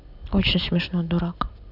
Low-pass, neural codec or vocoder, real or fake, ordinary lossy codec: 5.4 kHz; none; real; none